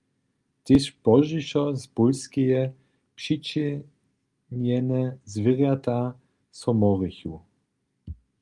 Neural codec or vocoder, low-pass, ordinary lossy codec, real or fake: none; 10.8 kHz; Opus, 32 kbps; real